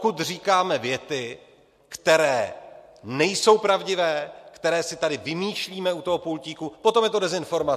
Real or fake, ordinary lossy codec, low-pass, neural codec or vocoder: real; MP3, 64 kbps; 14.4 kHz; none